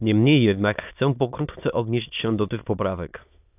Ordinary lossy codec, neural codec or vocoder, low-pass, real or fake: AAC, 32 kbps; autoencoder, 22.05 kHz, a latent of 192 numbers a frame, VITS, trained on many speakers; 3.6 kHz; fake